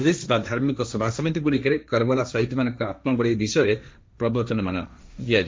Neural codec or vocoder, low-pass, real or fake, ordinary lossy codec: codec, 16 kHz, 1.1 kbps, Voila-Tokenizer; none; fake; none